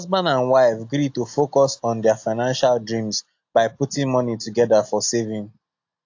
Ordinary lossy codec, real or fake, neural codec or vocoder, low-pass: AAC, 48 kbps; real; none; 7.2 kHz